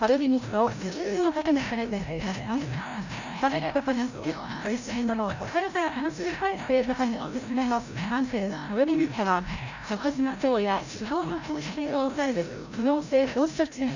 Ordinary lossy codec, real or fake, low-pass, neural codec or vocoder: none; fake; 7.2 kHz; codec, 16 kHz, 0.5 kbps, FreqCodec, larger model